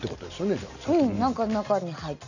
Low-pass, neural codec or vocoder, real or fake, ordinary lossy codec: 7.2 kHz; none; real; none